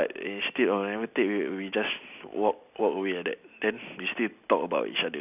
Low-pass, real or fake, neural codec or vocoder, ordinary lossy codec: 3.6 kHz; real; none; none